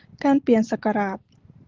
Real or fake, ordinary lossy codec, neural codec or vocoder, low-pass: real; Opus, 16 kbps; none; 7.2 kHz